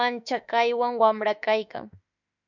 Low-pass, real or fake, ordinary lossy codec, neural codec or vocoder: 7.2 kHz; fake; MP3, 64 kbps; autoencoder, 48 kHz, 32 numbers a frame, DAC-VAE, trained on Japanese speech